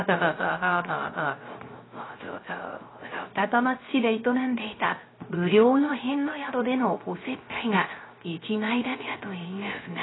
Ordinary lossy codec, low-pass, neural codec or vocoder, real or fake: AAC, 16 kbps; 7.2 kHz; codec, 16 kHz, 0.3 kbps, FocalCodec; fake